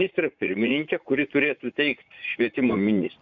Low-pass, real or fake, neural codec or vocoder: 7.2 kHz; fake; vocoder, 44.1 kHz, 80 mel bands, Vocos